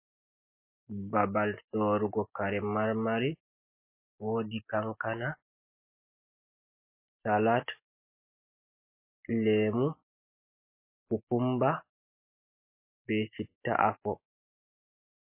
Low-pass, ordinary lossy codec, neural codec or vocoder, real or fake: 3.6 kHz; MP3, 24 kbps; none; real